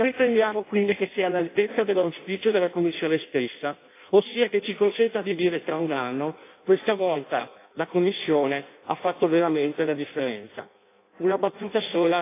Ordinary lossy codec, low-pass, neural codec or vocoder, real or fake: AAC, 24 kbps; 3.6 kHz; codec, 16 kHz in and 24 kHz out, 0.6 kbps, FireRedTTS-2 codec; fake